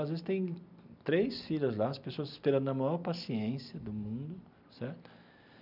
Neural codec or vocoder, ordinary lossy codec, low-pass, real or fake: none; none; 5.4 kHz; real